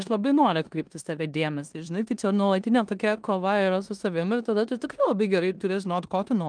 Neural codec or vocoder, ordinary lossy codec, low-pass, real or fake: codec, 16 kHz in and 24 kHz out, 0.9 kbps, LongCat-Audio-Codec, four codebook decoder; Opus, 32 kbps; 9.9 kHz; fake